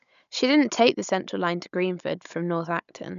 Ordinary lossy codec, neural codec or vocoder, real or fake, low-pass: none; codec, 16 kHz, 16 kbps, FunCodec, trained on Chinese and English, 50 frames a second; fake; 7.2 kHz